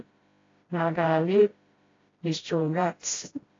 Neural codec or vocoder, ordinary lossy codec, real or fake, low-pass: codec, 16 kHz, 0.5 kbps, FreqCodec, smaller model; AAC, 32 kbps; fake; 7.2 kHz